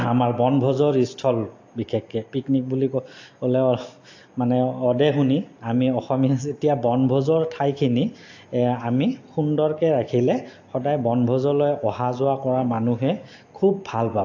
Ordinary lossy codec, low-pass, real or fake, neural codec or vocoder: AAC, 48 kbps; 7.2 kHz; real; none